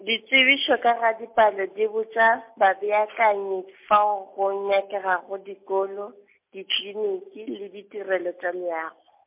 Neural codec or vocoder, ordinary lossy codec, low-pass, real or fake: none; MP3, 32 kbps; 3.6 kHz; real